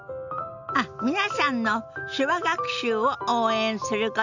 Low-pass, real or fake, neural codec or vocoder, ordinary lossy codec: 7.2 kHz; real; none; none